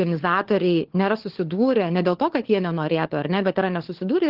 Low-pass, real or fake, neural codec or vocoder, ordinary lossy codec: 5.4 kHz; fake; codec, 16 kHz, 4 kbps, FunCodec, trained on LibriTTS, 50 frames a second; Opus, 16 kbps